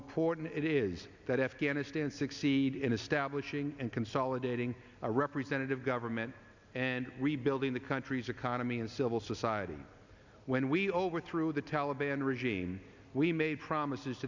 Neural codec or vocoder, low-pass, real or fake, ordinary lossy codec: none; 7.2 kHz; real; Opus, 64 kbps